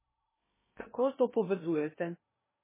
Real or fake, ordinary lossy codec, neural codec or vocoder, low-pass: fake; MP3, 16 kbps; codec, 16 kHz in and 24 kHz out, 0.6 kbps, FocalCodec, streaming, 2048 codes; 3.6 kHz